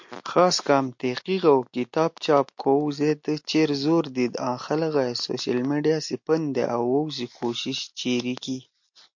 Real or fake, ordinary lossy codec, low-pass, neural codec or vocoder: real; MP3, 48 kbps; 7.2 kHz; none